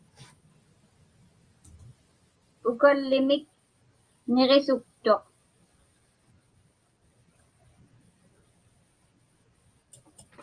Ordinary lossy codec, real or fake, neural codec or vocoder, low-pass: Opus, 32 kbps; real; none; 9.9 kHz